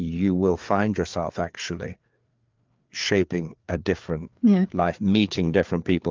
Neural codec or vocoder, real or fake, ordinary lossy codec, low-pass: codec, 16 kHz, 4 kbps, FreqCodec, larger model; fake; Opus, 24 kbps; 7.2 kHz